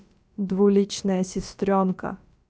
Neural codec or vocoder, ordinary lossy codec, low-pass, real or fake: codec, 16 kHz, about 1 kbps, DyCAST, with the encoder's durations; none; none; fake